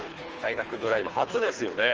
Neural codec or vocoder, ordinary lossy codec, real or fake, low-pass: codec, 24 kHz, 3 kbps, HILCodec; Opus, 24 kbps; fake; 7.2 kHz